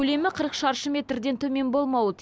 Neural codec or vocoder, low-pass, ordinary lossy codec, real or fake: none; none; none; real